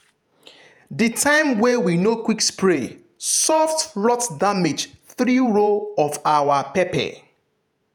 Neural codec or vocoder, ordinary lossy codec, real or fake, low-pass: vocoder, 48 kHz, 128 mel bands, Vocos; none; fake; none